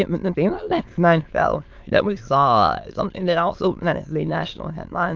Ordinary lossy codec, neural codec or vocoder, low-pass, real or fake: Opus, 32 kbps; autoencoder, 22.05 kHz, a latent of 192 numbers a frame, VITS, trained on many speakers; 7.2 kHz; fake